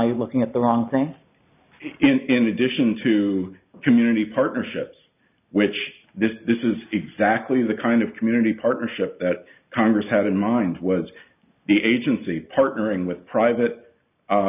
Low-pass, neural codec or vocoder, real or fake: 3.6 kHz; none; real